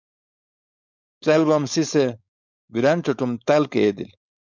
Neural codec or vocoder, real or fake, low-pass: codec, 16 kHz, 4.8 kbps, FACodec; fake; 7.2 kHz